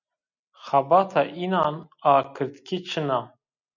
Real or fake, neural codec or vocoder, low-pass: real; none; 7.2 kHz